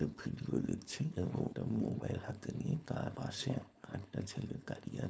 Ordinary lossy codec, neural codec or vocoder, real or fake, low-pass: none; codec, 16 kHz, 4.8 kbps, FACodec; fake; none